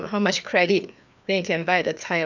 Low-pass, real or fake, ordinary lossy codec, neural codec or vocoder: 7.2 kHz; fake; none; codec, 16 kHz, 2 kbps, FreqCodec, larger model